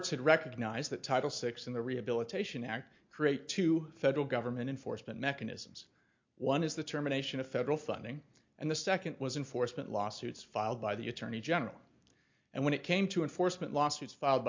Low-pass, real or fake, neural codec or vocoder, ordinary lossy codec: 7.2 kHz; real; none; MP3, 48 kbps